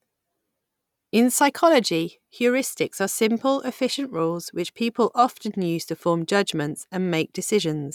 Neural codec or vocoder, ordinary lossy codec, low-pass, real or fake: none; none; 19.8 kHz; real